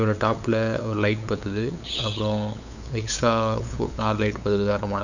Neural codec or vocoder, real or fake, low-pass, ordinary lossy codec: codec, 16 kHz, 8 kbps, FunCodec, trained on LibriTTS, 25 frames a second; fake; 7.2 kHz; none